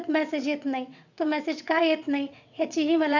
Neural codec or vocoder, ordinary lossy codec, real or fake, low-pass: vocoder, 22.05 kHz, 80 mel bands, WaveNeXt; none; fake; 7.2 kHz